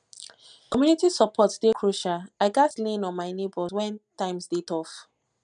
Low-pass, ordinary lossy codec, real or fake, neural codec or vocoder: 9.9 kHz; none; real; none